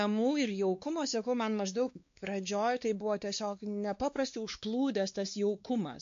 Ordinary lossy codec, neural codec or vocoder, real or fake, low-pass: MP3, 48 kbps; codec, 16 kHz, 2 kbps, X-Codec, WavLM features, trained on Multilingual LibriSpeech; fake; 7.2 kHz